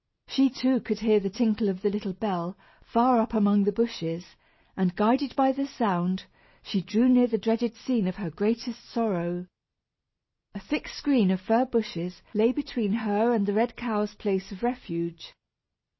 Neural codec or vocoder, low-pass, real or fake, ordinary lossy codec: none; 7.2 kHz; real; MP3, 24 kbps